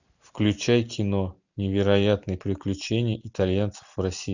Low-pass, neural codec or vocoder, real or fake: 7.2 kHz; none; real